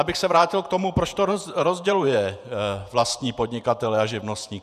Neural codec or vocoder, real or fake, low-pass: vocoder, 48 kHz, 128 mel bands, Vocos; fake; 14.4 kHz